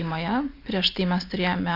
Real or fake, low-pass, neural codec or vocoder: real; 5.4 kHz; none